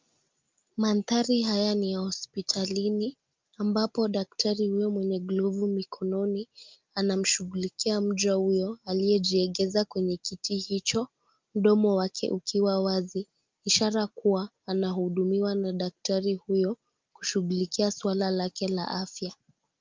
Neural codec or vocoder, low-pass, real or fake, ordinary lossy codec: none; 7.2 kHz; real; Opus, 24 kbps